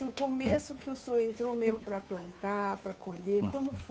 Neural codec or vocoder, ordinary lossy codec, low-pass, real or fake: codec, 16 kHz, 2 kbps, FunCodec, trained on Chinese and English, 25 frames a second; none; none; fake